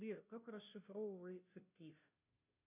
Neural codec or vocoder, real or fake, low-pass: codec, 16 kHz, 0.5 kbps, FunCodec, trained on Chinese and English, 25 frames a second; fake; 3.6 kHz